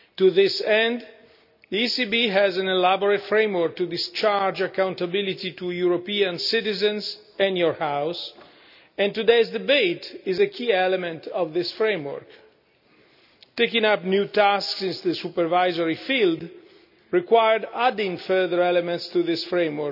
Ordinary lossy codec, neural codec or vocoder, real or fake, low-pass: none; none; real; 5.4 kHz